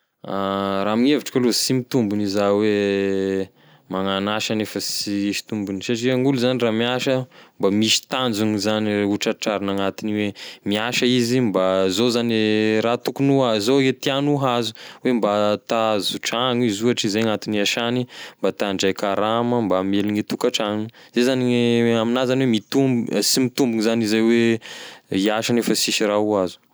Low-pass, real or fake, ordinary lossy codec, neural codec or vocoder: none; real; none; none